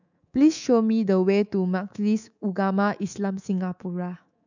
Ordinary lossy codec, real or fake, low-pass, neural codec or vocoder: none; fake; 7.2 kHz; codec, 24 kHz, 3.1 kbps, DualCodec